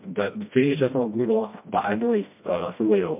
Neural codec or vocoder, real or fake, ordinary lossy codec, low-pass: codec, 16 kHz, 1 kbps, FreqCodec, smaller model; fake; MP3, 32 kbps; 3.6 kHz